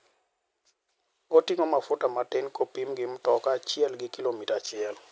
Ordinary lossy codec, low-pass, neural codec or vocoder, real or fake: none; none; none; real